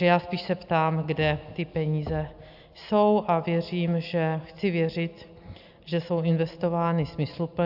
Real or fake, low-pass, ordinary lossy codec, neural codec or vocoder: real; 5.4 kHz; AAC, 48 kbps; none